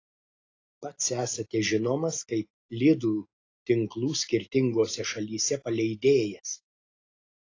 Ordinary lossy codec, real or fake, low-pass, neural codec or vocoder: AAC, 32 kbps; real; 7.2 kHz; none